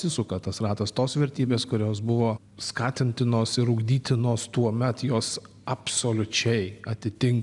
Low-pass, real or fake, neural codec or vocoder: 10.8 kHz; real; none